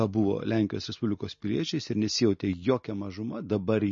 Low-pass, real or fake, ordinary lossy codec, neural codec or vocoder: 7.2 kHz; real; MP3, 32 kbps; none